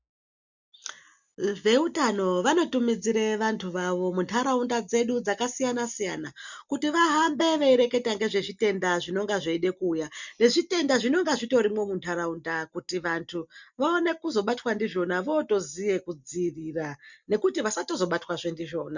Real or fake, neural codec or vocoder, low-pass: real; none; 7.2 kHz